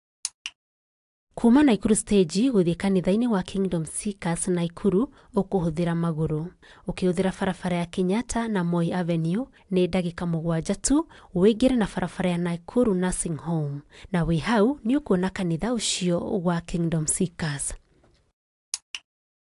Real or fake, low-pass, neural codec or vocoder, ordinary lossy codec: real; 10.8 kHz; none; AAC, 64 kbps